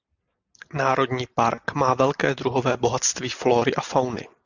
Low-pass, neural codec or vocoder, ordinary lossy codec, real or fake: 7.2 kHz; none; Opus, 64 kbps; real